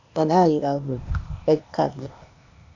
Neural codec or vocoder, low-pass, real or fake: codec, 16 kHz, 0.8 kbps, ZipCodec; 7.2 kHz; fake